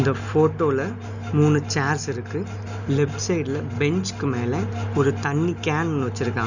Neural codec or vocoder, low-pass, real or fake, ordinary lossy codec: none; 7.2 kHz; real; none